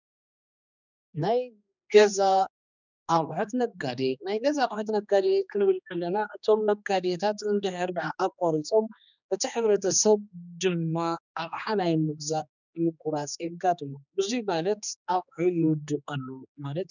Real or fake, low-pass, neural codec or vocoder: fake; 7.2 kHz; codec, 16 kHz, 2 kbps, X-Codec, HuBERT features, trained on general audio